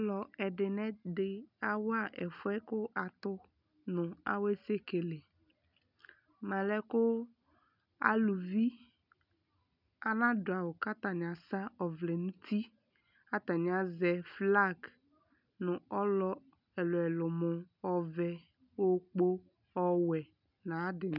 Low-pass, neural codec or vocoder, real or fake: 5.4 kHz; none; real